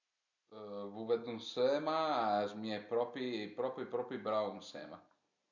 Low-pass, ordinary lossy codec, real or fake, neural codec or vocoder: 7.2 kHz; none; real; none